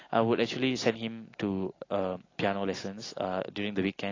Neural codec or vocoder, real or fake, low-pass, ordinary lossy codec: none; real; 7.2 kHz; AAC, 32 kbps